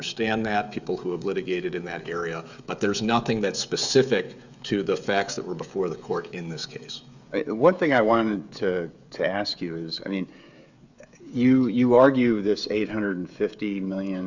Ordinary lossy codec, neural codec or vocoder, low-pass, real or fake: Opus, 64 kbps; codec, 16 kHz, 16 kbps, FreqCodec, smaller model; 7.2 kHz; fake